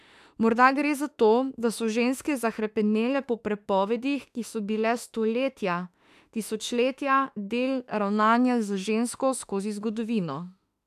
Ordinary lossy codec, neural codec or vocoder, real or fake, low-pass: none; autoencoder, 48 kHz, 32 numbers a frame, DAC-VAE, trained on Japanese speech; fake; 14.4 kHz